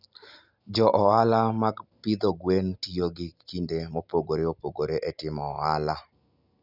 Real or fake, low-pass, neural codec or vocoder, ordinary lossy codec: real; 5.4 kHz; none; none